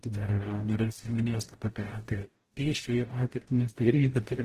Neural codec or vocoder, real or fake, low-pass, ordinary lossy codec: codec, 44.1 kHz, 0.9 kbps, DAC; fake; 14.4 kHz; Opus, 16 kbps